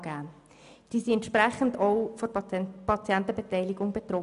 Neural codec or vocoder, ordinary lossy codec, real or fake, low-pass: none; none; real; 10.8 kHz